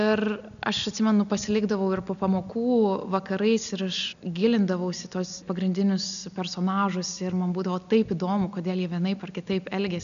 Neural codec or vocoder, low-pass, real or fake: none; 7.2 kHz; real